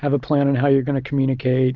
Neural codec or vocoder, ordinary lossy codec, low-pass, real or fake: none; Opus, 24 kbps; 7.2 kHz; real